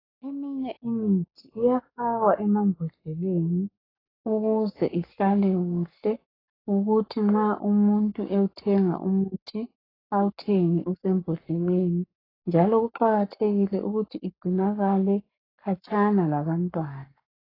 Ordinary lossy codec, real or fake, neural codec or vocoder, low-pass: AAC, 24 kbps; fake; codec, 44.1 kHz, 7.8 kbps, Pupu-Codec; 5.4 kHz